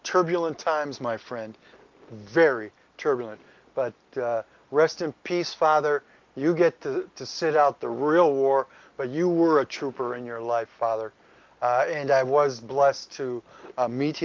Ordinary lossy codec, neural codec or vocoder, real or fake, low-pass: Opus, 32 kbps; none; real; 7.2 kHz